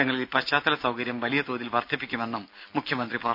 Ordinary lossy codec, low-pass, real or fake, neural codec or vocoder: none; 5.4 kHz; real; none